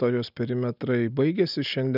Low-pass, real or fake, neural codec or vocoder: 5.4 kHz; real; none